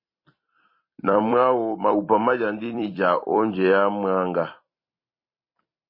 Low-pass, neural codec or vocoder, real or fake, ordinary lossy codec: 5.4 kHz; vocoder, 44.1 kHz, 128 mel bands every 256 samples, BigVGAN v2; fake; MP3, 24 kbps